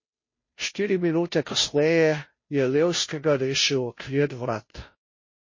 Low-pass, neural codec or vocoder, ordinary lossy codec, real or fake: 7.2 kHz; codec, 16 kHz, 0.5 kbps, FunCodec, trained on Chinese and English, 25 frames a second; MP3, 32 kbps; fake